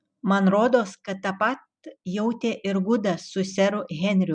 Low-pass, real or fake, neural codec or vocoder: 9.9 kHz; real; none